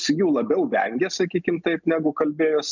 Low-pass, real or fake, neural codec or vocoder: 7.2 kHz; real; none